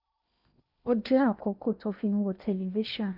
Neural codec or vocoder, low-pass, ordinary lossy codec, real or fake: codec, 16 kHz in and 24 kHz out, 0.8 kbps, FocalCodec, streaming, 65536 codes; 5.4 kHz; none; fake